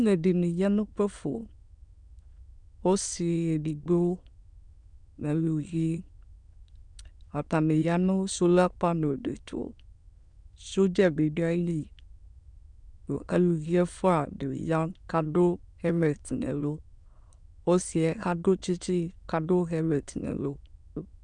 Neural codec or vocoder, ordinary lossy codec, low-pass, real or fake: autoencoder, 22.05 kHz, a latent of 192 numbers a frame, VITS, trained on many speakers; MP3, 96 kbps; 9.9 kHz; fake